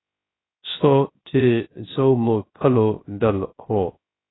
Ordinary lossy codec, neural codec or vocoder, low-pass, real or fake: AAC, 16 kbps; codec, 16 kHz, 0.3 kbps, FocalCodec; 7.2 kHz; fake